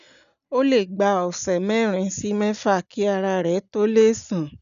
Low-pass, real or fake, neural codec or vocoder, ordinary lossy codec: 7.2 kHz; real; none; none